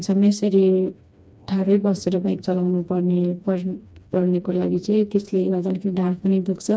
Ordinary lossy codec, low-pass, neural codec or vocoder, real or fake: none; none; codec, 16 kHz, 2 kbps, FreqCodec, smaller model; fake